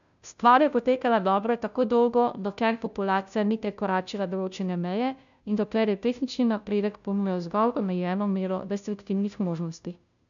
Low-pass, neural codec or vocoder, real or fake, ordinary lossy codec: 7.2 kHz; codec, 16 kHz, 0.5 kbps, FunCodec, trained on Chinese and English, 25 frames a second; fake; none